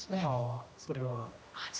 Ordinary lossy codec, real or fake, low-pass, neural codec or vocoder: none; fake; none; codec, 16 kHz, 1 kbps, X-Codec, HuBERT features, trained on general audio